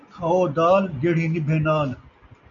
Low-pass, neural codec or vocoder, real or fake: 7.2 kHz; none; real